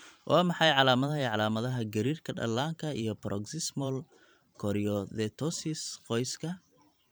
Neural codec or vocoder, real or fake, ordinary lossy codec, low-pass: vocoder, 44.1 kHz, 128 mel bands every 512 samples, BigVGAN v2; fake; none; none